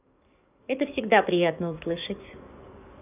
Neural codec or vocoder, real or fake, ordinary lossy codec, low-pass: codec, 44.1 kHz, 7.8 kbps, DAC; fake; none; 3.6 kHz